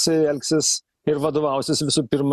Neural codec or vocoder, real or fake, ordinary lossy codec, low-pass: none; real; Opus, 64 kbps; 14.4 kHz